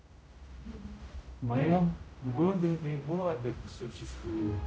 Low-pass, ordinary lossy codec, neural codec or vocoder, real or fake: none; none; codec, 16 kHz, 0.5 kbps, X-Codec, HuBERT features, trained on general audio; fake